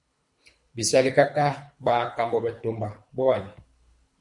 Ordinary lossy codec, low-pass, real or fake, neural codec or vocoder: MP3, 64 kbps; 10.8 kHz; fake; codec, 24 kHz, 3 kbps, HILCodec